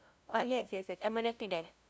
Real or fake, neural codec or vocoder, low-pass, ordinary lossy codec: fake; codec, 16 kHz, 0.5 kbps, FunCodec, trained on LibriTTS, 25 frames a second; none; none